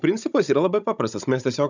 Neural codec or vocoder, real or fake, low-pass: codec, 16 kHz, 16 kbps, FunCodec, trained on Chinese and English, 50 frames a second; fake; 7.2 kHz